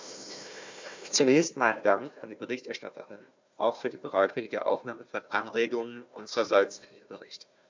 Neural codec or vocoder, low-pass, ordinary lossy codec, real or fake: codec, 16 kHz, 1 kbps, FunCodec, trained on Chinese and English, 50 frames a second; 7.2 kHz; MP3, 64 kbps; fake